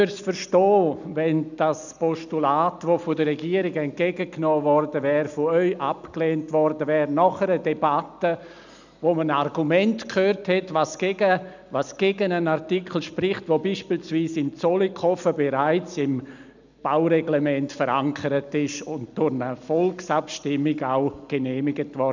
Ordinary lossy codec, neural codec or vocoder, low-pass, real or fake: none; none; 7.2 kHz; real